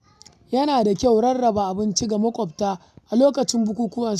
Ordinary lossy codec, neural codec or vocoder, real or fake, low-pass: none; none; real; 14.4 kHz